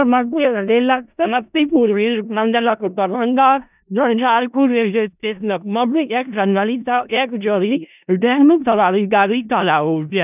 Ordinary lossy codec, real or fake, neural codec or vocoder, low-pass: none; fake; codec, 16 kHz in and 24 kHz out, 0.4 kbps, LongCat-Audio-Codec, four codebook decoder; 3.6 kHz